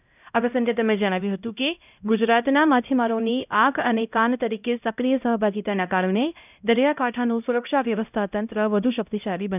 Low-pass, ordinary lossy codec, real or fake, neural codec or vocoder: 3.6 kHz; none; fake; codec, 16 kHz, 0.5 kbps, X-Codec, HuBERT features, trained on LibriSpeech